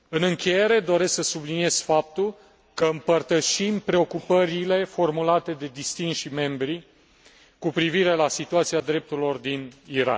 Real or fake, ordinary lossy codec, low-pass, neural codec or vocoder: real; none; none; none